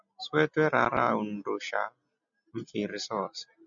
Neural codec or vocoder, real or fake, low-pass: none; real; 7.2 kHz